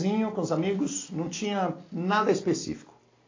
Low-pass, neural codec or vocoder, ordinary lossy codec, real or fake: 7.2 kHz; none; AAC, 32 kbps; real